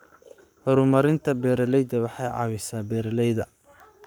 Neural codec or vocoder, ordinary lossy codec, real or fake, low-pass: codec, 44.1 kHz, 7.8 kbps, Pupu-Codec; none; fake; none